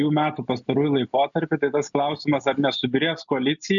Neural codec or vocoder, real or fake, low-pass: none; real; 7.2 kHz